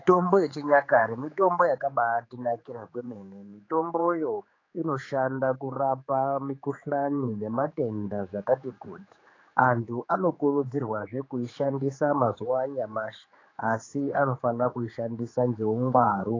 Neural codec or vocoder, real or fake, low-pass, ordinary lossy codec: codec, 16 kHz, 4 kbps, X-Codec, HuBERT features, trained on general audio; fake; 7.2 kHz; AAC, 32 kbps